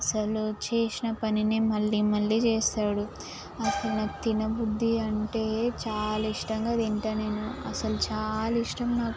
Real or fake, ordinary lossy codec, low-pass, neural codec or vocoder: real; none; none; none